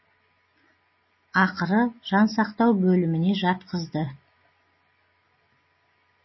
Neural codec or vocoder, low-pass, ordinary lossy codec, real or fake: none; 7.2 kHz; MP3, 24 kbps; real